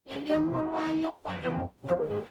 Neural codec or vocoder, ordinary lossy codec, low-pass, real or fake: codec, 44.1 kHz, 0.9 kbps, DAC; none; 19.8 kHz; fake